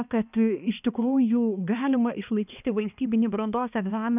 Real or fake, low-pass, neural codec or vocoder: fake; 3.6 kHz; codec, 16 kHz, 2 kbps, X-Codec, HuBERT features, trained on balanced general audio